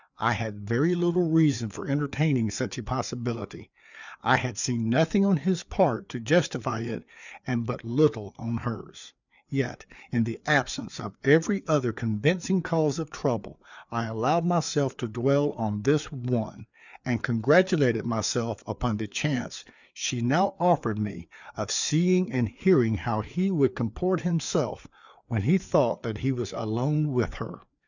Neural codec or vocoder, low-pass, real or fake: codec, 16 kHz, 4 kbps, FreqCodec, larger model; 7.2 kHz; fake